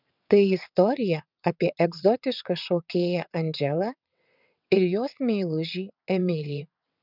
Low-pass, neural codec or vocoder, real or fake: 5.4 kHz; vocoder, 22.05 kHz, 80 mel bands, WaveNeXt; fake